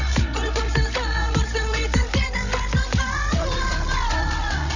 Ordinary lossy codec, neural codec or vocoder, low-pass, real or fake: none; codec, 16 kHz, 16 kbps, FreqCodec, larger model; 7.2 kHz; fake